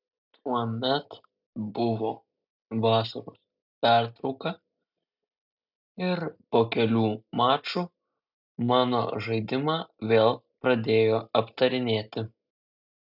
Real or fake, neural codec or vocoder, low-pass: real; none; 5.4 kHz